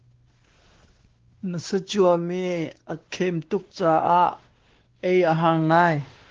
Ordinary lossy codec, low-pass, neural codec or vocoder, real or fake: Opus, 16 kbps; 7.2 kHz; codec, 16 kHz, 2 kbps, X-Codec, WavLM features, trained on Multilingual LibriSpeech; fake